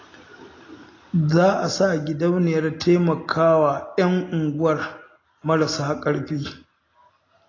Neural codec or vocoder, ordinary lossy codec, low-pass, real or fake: none; AAC, 32 kbps; 7.2 kHz; real